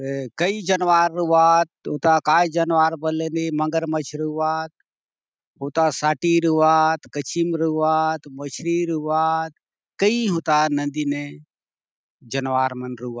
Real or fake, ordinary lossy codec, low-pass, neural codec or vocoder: real; none; none; none